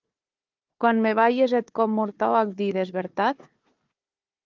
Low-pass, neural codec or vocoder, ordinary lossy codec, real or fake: 7.2 kHz; codec, 16 kHz, 4 kbps, FunCodec, trained on Chinese and English, 50 frames a second; Opus, 32 kbps; fake